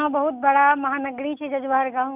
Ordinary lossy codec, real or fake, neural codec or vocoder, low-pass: none; real; none; 3.6 kHz